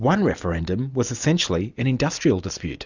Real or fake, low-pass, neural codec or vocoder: real; 7.2 kHz; none